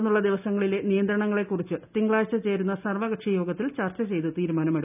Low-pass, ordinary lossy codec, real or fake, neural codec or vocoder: 3.6 kHz; none; real; none